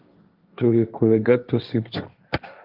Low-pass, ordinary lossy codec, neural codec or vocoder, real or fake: 5.4 kHz; Opus, 32 kbps; codec, 16 kHz, 1.1 kbps, Voila-Tokenizer; fake